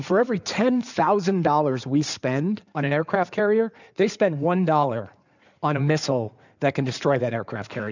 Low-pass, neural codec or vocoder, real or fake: 7.2 kHz; codec, 16 kHz in and 24 kHz out, 2.2 kbps, FireRedTTS-2 codec; fake